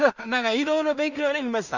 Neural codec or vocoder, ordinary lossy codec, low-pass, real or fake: codec, 16 kHz in and 24 kHz out, 0.4 kbps, LongCat-Audio-Codec, two codebook decoder; none; 7.2 kHz; fake